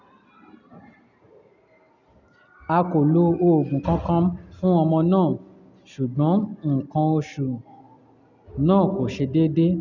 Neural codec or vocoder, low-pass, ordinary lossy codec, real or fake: none; 7.2 kHz; none; real